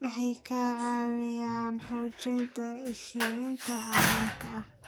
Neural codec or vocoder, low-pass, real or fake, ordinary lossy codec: codec, 44.1 kHz, 2.6 kbps, SNAC; none; fake; none